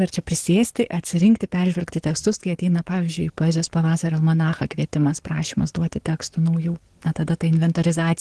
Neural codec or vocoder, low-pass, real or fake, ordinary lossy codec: codec, 44.1 kHz, 7.8 kbps, DAC; 10.8 kHz; fake; Opus, 16 kbps